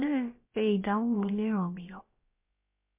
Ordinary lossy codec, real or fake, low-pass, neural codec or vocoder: MP3, 24 kbps; fake; 3.6 kHz; codec, 16 kHz, about 1 kbps, DyCAST, with the encoder's durations